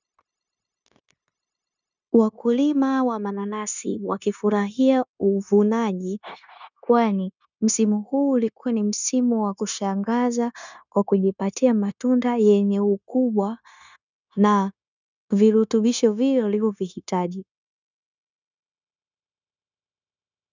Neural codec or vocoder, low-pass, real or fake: codec, 16 kHz, 0.9 kbps, LongCat-Audio-Codec; 7.2 kHz; fake